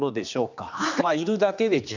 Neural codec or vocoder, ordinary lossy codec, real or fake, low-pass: codec, 16 kHz, 2 kbps, X-Codec, HuBERT features, trained on general audio; none; fake; 7.2 kHz